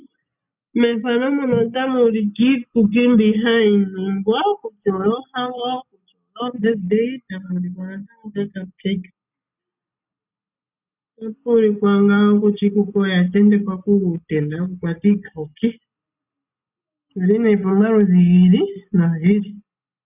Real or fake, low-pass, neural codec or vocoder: real; 3.6 kHz; none